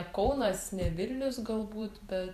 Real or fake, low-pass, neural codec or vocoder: fake; 14.4 kHz; vocoder, 44.1 kHz, 128 mel bands every 512 samples, BigVGAN v2